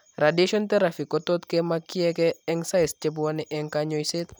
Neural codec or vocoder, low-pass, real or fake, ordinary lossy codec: none; none; real; none